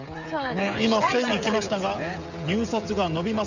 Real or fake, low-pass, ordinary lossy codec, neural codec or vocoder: fake; 7.2 kHz; none; codec, 16 kHz, 16 kbps, FreqCodec, smaller model